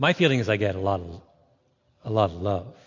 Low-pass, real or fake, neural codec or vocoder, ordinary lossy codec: 7.2 kHz; real; none; MP3, 48 kbps